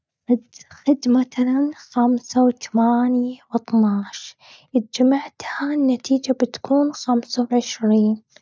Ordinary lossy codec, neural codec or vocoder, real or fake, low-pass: none; none; real; none